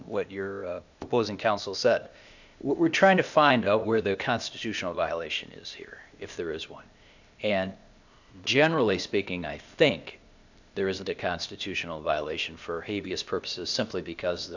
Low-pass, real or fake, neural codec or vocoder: 7.2 kHz; fake; codec, 16 kHz, 0.8 kbps, ZipCodec